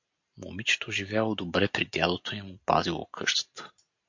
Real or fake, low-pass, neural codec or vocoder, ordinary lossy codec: real; 7.2 kHz; none; MP3, 48 kbps